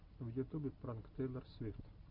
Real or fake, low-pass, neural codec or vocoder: real; 5.4 kHz; none